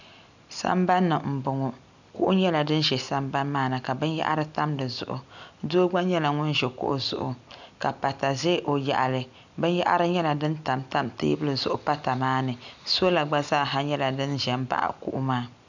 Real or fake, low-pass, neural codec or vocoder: real; 7.2 kHz; none